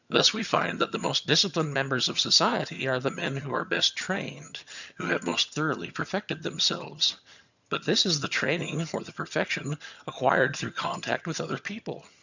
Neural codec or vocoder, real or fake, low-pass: vocoder, 22.05 kHz, 80 mel bands, HiFi-GAN; fake; 7.2 kHz